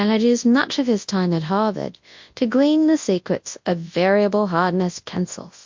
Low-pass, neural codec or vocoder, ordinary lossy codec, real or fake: 7.2 kHz; codec, 24 kHz, 0.9 kbps, WavTokenizer, large speech release; MP3, 48 kbps; fake